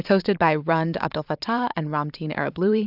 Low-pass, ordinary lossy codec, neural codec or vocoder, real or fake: 5.4 kHz; AAC, 48 kbps; vocoder, 44.1 kHz, 128 mel bands every 512 samples, BigVGAN v2; fake